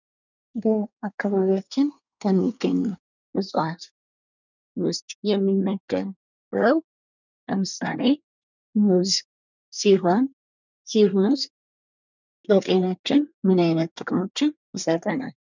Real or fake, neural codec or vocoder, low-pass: fake; codec, 24 kHz, 1 kbps, SNAC; 7.2 kHz